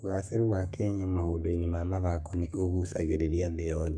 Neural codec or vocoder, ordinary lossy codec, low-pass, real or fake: codec, 32 kHz, 1.9 kbps, SNAC; MP3, 64 kbps; 9.9 kHz; fake